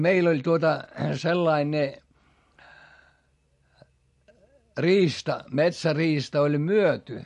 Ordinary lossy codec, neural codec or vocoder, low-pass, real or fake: MP3, 48 kbps; none; 14.4 kHz; real